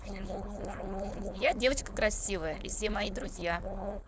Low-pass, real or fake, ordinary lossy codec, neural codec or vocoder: none; fake; none; codec, 16 kHz, 4.8 kbps, FACodec